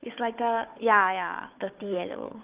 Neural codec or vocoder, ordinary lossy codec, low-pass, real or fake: codec, 16 kHz, 4 kbps, FunCodec, trained on Chinese and English, 50 frames a second; Opus, 32 kbps; 3.6 kHz; fake